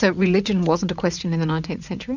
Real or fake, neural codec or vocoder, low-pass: fake; vocoder, 44.1 kHz, 80 mel bands, Vocos; 7.2 kHz